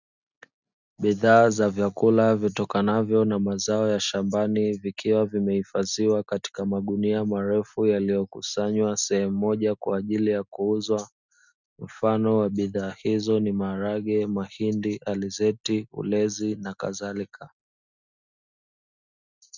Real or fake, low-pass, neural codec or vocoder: real; 7.2 kHz; none